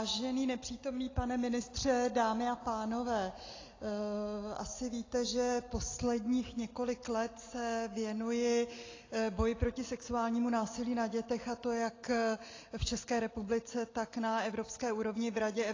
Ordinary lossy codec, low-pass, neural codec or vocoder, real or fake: AAC, 32 kbps; 7.2 kHz; none; real